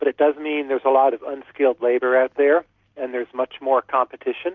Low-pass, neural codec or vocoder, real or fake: 7.2 kHz; none; real